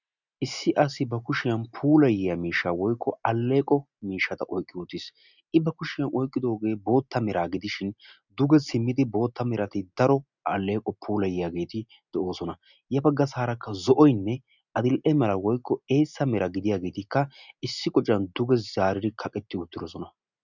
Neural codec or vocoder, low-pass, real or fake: none; 7.2 kHz; real